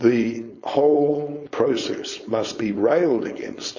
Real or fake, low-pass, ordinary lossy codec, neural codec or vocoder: fake; 7.2 kHz; MP3, 32 kbps; codec, 16 kHz, 4.8 kbps, FACodec